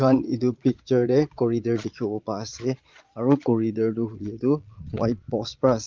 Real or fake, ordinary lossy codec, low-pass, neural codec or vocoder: fake; Opus, 32 kbps; 7.2 kHz; vocoder, 22.05 kHz, 80 mel bands, Vocos